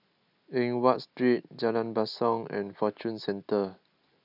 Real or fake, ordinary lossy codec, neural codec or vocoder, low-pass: real; none; none; 5.4 kHz